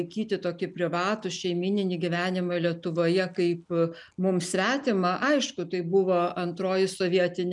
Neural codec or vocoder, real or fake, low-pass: none; real; 10.8 kHz